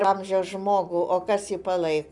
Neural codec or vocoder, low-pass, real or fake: none; 10.8 kHz; real